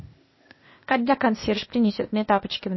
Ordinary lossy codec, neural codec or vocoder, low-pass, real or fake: MP3, 24 kbps; codec, 16 kHz, 0.8 kbps, ZipCodec; 7.2 kHz; fake